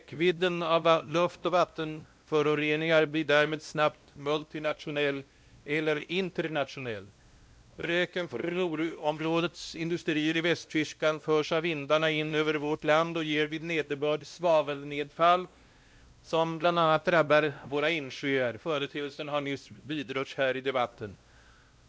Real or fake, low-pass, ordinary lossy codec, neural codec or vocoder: fake; none; none; codec, 16 kHz, 1 kbps, X-Codec, WavLM features, trained on Multilingual LibriSpeech